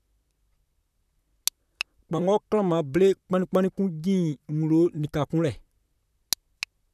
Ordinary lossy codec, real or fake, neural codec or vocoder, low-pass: none; fake; vocoder, 44.1 kHz, 128 mel bands, Pupu-Vocoder; 14.4 kHz